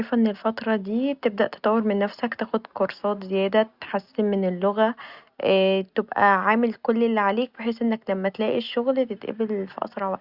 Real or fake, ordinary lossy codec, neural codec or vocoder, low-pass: real; Opus, 64 kbps; none; 5.4 kHz